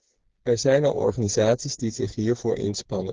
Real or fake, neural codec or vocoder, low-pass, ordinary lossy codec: fake; codec, 16 kHz, 4 kbps, FreqCodec, smaller model; 7.2 kHz; Opus, 16 kbps